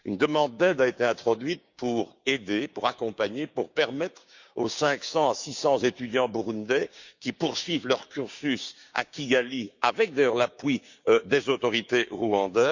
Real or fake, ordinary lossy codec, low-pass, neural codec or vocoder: fake; Opus, 64 kbps; 7.2 kHz; codec, 16 kHz, 6 kbps, DAC